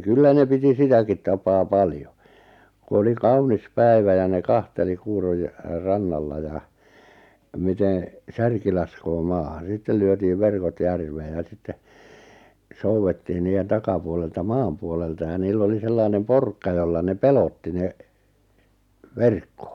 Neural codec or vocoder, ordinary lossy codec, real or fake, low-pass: none; none; real; 19.8 kHz